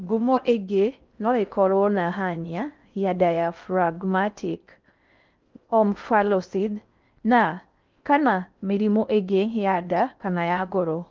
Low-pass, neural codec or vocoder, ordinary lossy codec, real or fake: 7.2 kHz; codec, 16 kHz in and 24 kHz out, 0.6 kbps, FocalCodec, streaming, 4096 codes; Opus, 32 kbps; fake